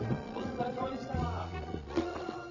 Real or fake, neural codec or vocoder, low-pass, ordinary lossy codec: fake; vocoder, 22.05 kHz, 80 mel bands, Vocos; 7.2 kHz; none